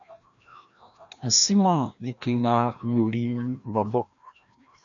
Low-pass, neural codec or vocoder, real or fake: 7.2 kHz; codec, 16 kHz, 1 kbps, FreqCodec, larger model; fake